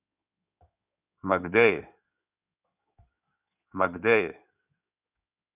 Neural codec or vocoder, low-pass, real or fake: codec, 16 kHz in and 24 kHz out, 2.2 kbps, FireRedTTS-2 codec; 3.6 kHz; fake